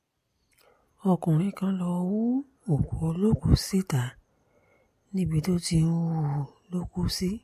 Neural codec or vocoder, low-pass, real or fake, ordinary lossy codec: none; 14.4 kHz; real; MP3, 64 kbps